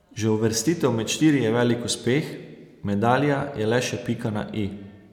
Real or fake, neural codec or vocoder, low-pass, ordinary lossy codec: real; none; 19.8 kHz; none